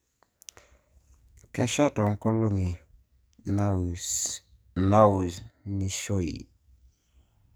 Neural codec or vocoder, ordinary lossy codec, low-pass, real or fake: codec, 44.1 kHz, 2.6 kbps, SNAC; none; none; fake